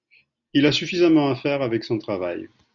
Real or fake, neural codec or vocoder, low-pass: real; none; 7.2 kHz